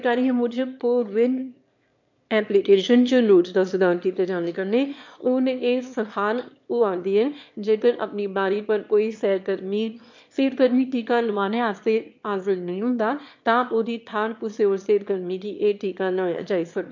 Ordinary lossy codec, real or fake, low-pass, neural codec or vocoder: MP3, 48 kbps; fake; 7.2 kHz; autoencoder, 22.05 kHz, a latent of 192 numbers a frame, VITS, trained on one speaker